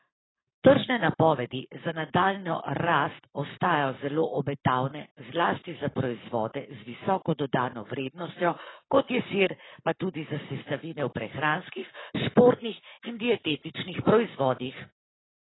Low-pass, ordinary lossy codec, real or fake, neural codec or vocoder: 7.2 kHz; AAC, 16 kbps; fake; codec, 44.1 kHz, 7.8 kbps, Pupu-Codec